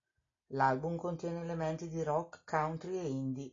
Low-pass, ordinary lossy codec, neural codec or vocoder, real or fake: 7.2 kHz; AAC, 32 kbps; none; real